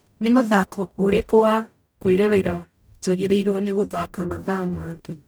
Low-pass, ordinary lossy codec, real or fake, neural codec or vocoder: none; none; fake; codec, 44.1 kHz, 0.9 kbps, DAC